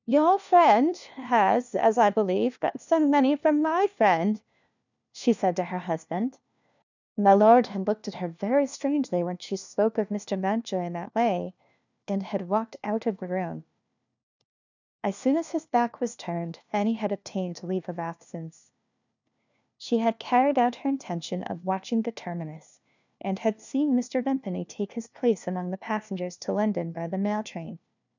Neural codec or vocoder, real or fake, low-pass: codec, 16 kHz, 1 kbps, FunCodec, trained on LibriTTS, 50 frames a second; fake; 7.2 kHz